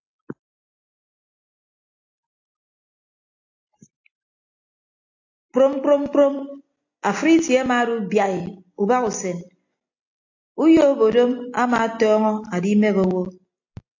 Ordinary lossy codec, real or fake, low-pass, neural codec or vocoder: AAC, 48 kbps; real; 7.2 kHz; none